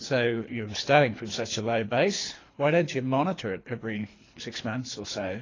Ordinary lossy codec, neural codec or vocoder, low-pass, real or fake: AAC, 32 kbps; codec, 24 kHz, 3 kbps, HILCodec; 7.2 kHz; fake